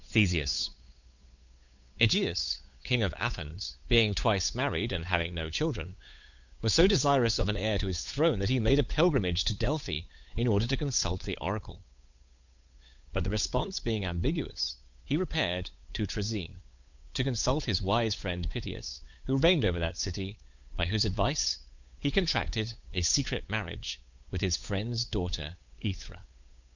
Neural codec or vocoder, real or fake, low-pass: codec, 16 kHz, 8 kbps, FunCodec, trained on Chinese and English, 25 frames a second; fake; 7.2 kHz